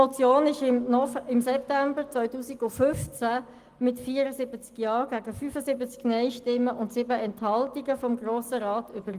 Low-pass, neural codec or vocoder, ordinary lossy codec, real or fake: 14.4 kHz; none; Opus, 24 kbps; real